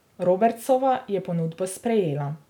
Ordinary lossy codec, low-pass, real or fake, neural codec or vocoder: none; 19.8 kHz; real; none